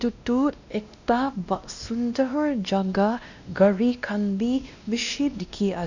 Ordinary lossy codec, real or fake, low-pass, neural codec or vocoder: none; fake; 7.2 kHz; codec, 16 kHz, 1 kbps, X-Codec, WavLM features, trained on Multilingual LibriSpeech